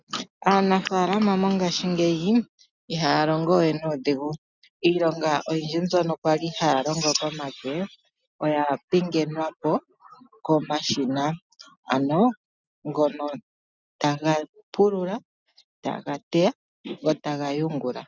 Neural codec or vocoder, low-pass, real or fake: none; 7.2 kHz; real